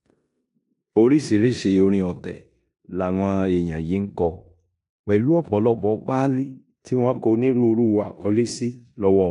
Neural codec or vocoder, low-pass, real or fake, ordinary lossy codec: codec, 16 kHz in and 24 kHz out, 0.9 kbps, LongCat-Audio-Codec, four codebook decoder; 10.8 kHz; fake; none